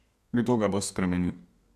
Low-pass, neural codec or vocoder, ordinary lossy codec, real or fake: 14.4 kHz; codec, 32 kHz, 1.9 kbps, SNAC; none; fake